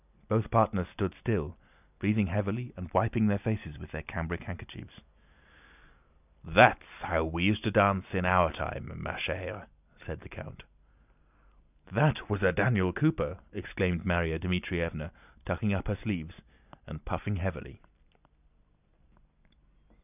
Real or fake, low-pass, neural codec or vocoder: real; 3.6 kHz; none